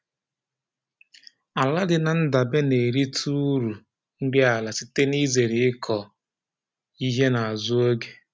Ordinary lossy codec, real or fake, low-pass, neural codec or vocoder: none; real; none; none